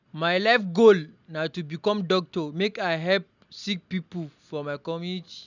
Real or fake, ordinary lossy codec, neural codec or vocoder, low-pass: real; none; none; 7.2 kHz